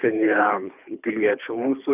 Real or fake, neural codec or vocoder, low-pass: fake; codec, 24 kHz, 3 kbps, HILCodec; 3.6 kHz